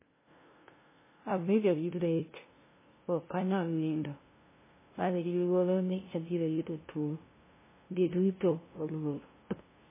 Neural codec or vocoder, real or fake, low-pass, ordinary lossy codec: codec, 16 kHz, 0.5 kbps, FunCodec, trained on LibriTTS, 25 frames a second; fake; 3.6 kHz; MP3, 16 kbps